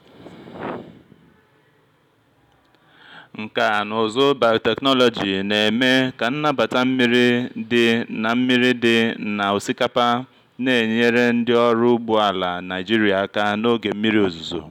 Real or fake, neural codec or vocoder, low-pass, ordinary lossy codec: real; none; 19.8 kHz; none